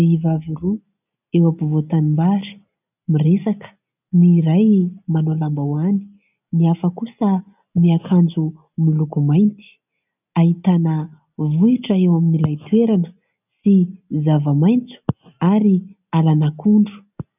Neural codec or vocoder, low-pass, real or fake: none; 3.6 kHz; real